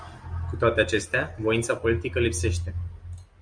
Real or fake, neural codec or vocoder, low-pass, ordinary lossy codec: real; none; 9.9 kHz; Opus, 64 kbps